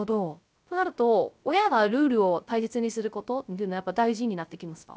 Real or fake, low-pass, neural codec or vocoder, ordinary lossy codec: fake; none; codec, 16 kHz, 0.3 kbps, FocalCodec; none